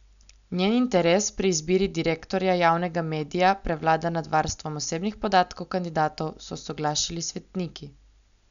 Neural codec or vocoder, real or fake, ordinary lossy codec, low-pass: none; real; none; 7.2 kHz